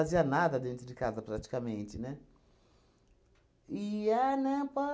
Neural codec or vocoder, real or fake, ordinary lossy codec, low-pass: none; real; none; none